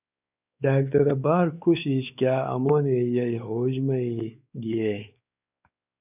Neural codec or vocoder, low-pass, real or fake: codec, 16 kHz, 4 kbps, X-Codec, WavLM features, trained on Multilingual LibriSpeech; 3.6 kHz; fake